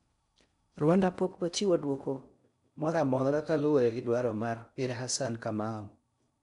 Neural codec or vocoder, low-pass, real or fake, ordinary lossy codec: codec, 16 kHz in and 24 kHz out, 0.6 kbps, FocalCodec, streaming, 4096 codes; 10.8 kHz; fake; none